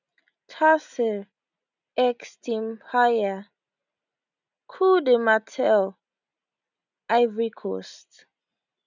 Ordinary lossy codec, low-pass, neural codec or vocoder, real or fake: none; 7.2 kHz; none; real